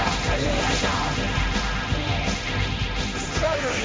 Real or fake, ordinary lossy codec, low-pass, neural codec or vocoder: fake; none; none; codec, 16 kHz, 1.1 kbps, Voila-Tokenizer